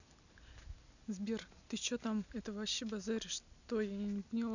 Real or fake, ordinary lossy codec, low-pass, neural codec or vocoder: real; none; 7.2 kHz; none